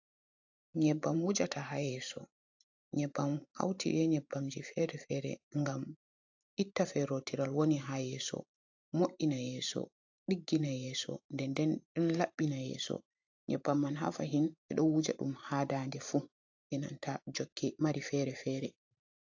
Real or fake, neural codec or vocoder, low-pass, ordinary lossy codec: fake; vocoder, 44.1 kHz, 128 mel bands every 512 samples, BigVGAN v2; 7.2 kHz; AAC, 48 kbps